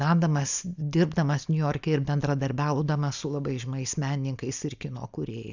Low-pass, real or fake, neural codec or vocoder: 7.2 kHz; real; none